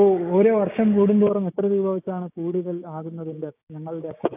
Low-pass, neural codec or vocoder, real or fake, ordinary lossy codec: 3.6 kHz; codec, 16 kHz, 6 kbps, DAC; fake; AAC, 32 kbps